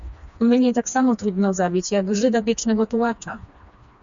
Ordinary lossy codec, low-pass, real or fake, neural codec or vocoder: MP3, 64 kbps; 7.2 kHz; fake; codec, 16 kHz, 2 kbps, FreqCodec, smaller model